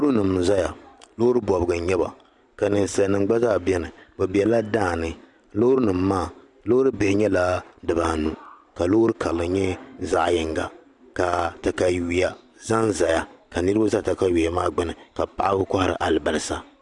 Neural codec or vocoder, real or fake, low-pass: vocoder, 44.1 kHz, 128 mel bands every 512 samples, BigVGAN v2; fake; 10.8 kHz